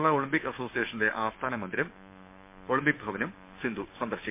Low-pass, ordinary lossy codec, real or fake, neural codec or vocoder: 3.6 kHz; MP3, 32 kbps; fake; codec, 16 kHz, 6 kbps, DAC